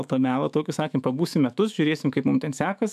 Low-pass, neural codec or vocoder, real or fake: 14.4 kHz; autoencoder, 48 kHz, 128 numbers a frame, DAC-VAE, trained on Japanese speech; fake